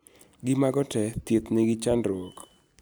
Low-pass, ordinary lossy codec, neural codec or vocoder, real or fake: none; none; none; real